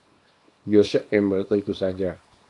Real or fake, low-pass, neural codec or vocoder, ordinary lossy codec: fake; 10.8 kHz; codec, 24 kHz, 0.9 kbps, WavTokenizer, small release; AAC, 48 kbps